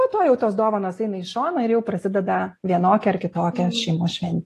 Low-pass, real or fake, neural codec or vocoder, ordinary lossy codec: 14.4 kHz; real; none; AAC, 48 kbps